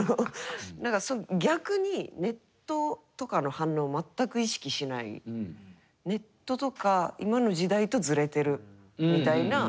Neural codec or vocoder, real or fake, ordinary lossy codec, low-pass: none; real; none; none